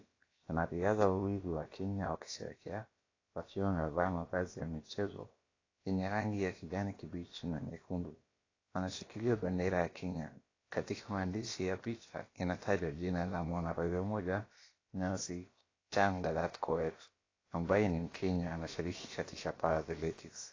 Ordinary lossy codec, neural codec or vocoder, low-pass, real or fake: AAC, 32 kbps; codec, 16 kHz, about 1 kbps, DyCAST, with the encoder's durations; 7.2 kHz; fake